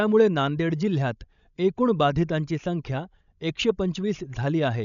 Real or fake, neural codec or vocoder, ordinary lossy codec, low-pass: fake; codec, 16 kHz, 16 kbps, FreqCodec, larger model; none; 7.2 kHz